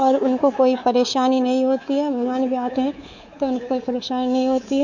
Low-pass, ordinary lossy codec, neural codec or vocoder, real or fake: 7.2 kHz; none; codec, 24 kHz, 3.1 kbps, DualCodec; fake